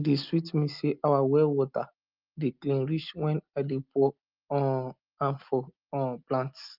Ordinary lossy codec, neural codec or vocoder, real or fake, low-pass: Opus, 24 kbps; none; real; 5.4 kHz